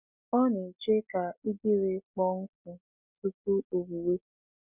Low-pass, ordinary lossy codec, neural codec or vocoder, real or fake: 3.6 kHz; none; none; real